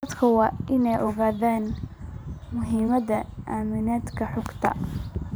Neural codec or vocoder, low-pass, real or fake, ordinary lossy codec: vocoder, 44.1 kHz, 128 mel bands every 512 samples, BigVGAN v2; none; fake; none